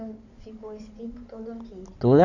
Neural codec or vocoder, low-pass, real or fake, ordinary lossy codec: codec, 16 kHz in and 24 kHz out, 2.2 kbps, FireRedTTS-2 codec; 7.2 kHz; fake; none